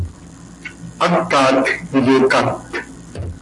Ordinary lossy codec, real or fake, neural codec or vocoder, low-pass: AAC, 64 kbps; real; none; 10.8 kHz